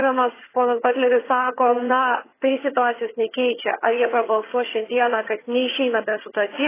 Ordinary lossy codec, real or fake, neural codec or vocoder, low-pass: AAC, 16 kbps; fake; vocoder, 22.05 kHz, 80 mel bands, HiFi-GAN; 3.6 kHz